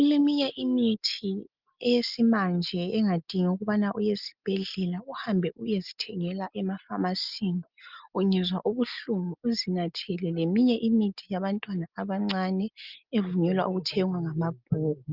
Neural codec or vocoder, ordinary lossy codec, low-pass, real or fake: none; Opus, 24 kbps; 5.4 kHz; real